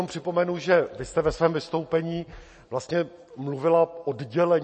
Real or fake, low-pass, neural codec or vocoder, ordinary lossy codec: real; 10.8 kHz; none; MP3, 32 kbps